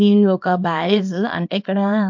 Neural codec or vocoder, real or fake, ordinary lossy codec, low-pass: codec, 24 kHz, 0.9 kbps, WavTokenizer, small release; fake; MP3, 48 kbps; 7.2 kHz